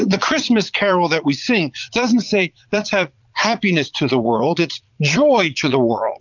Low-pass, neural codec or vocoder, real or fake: 7.2 kHz; vocoder, 22.05 kHz, 80 mel bands, Vocos; fake